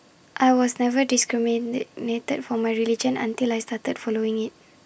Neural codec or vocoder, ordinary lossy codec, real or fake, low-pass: none; none; real; none